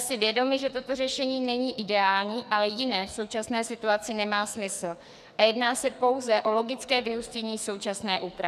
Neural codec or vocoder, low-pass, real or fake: codec, 44.1 kHz, 2.6 kbps, SNAC; 14.4 kHz; fake